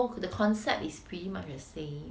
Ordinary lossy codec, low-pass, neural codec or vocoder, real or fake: none; none; none; real